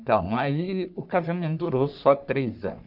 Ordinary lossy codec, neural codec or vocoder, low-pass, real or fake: none; codec, 44.1 kHz, 3.4 kbps, Pupu-Codec; 5.4 kHz; fake